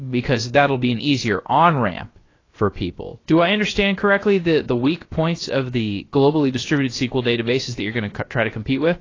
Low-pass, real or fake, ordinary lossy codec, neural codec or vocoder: 7.2 kHz; fake; AAC, 32 kbps; codec, 16 kHz, about 1 kbps, DyCAST, with the encoder's durations